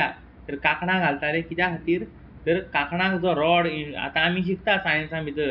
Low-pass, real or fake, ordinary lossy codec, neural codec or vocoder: 5.4 kHz; real; none; none